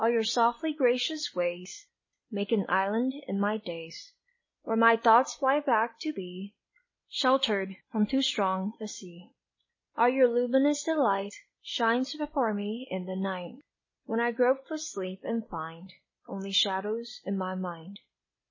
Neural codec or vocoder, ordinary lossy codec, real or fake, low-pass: none; MP3, 32 kbps; real; 7.2 kHz